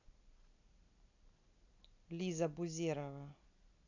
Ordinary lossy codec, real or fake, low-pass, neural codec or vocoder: none; real; 7.2 kHz; none